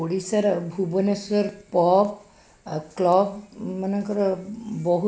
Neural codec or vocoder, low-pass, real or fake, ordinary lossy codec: none; none; real; none